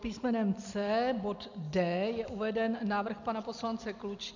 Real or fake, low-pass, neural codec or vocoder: real; 7.2 kHz; none